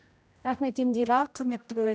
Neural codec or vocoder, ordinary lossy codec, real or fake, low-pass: codec, 16 kHz, 0.5 kbps, X-Codec, HuBERT features, trained on general audio; none; fake; none